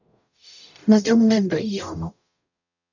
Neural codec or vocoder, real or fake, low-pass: codec, 44.1 kHz, 0.9 kbps, DAC; fake; 7.2 kHz